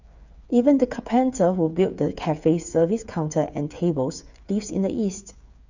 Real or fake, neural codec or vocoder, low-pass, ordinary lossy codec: fake; codec, 16 kHz, 8 kbps, FreqCodec, smaller model; 7.2 kHz; none